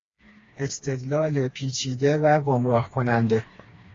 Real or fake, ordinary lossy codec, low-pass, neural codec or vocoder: fake; AAC, 32 kbps; 7.2 kHz; codec, 16 kHz, 2 kbps, FreqCodec, smaller model